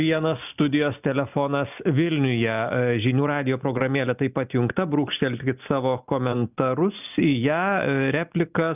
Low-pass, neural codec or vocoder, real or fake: 3.6 kHz; none; real